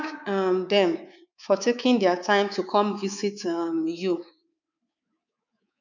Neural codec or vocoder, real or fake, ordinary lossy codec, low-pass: codec, 24 kHz, 3.1 kbps, DualCodec; fake; none; 7.2 kHz